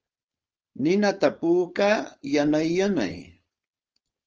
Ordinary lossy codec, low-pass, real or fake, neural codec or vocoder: Opus, 24 kbps; 7.2 kHz; fake; codec, 16 kHz, 4.8 kbps, FACodec